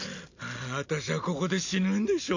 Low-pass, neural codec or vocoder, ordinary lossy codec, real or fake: 7.2 kHz; none; none; real